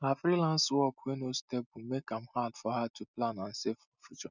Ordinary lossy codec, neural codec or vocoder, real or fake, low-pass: none; none; real; 7.2 kHz